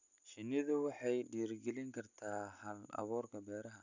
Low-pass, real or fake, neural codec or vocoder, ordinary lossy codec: 7.2 kHz; real; none; none